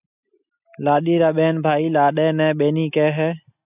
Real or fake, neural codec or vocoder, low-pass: real; none; 3.6 kHz